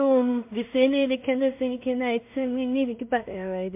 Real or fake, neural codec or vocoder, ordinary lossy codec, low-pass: fake; codec, 16 kHz in and 24 kHz out, 0.4 kbps, LongCat-Audio-Codec, two codebook decoder; MP3, 32 kbps; 3.6 kHz